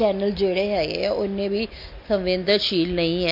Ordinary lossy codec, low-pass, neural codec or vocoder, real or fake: MP3, 32 kbps; 5.4 kHz; none; real